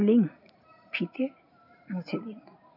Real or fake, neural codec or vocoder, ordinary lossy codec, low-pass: real; none; none; 5.4 kHz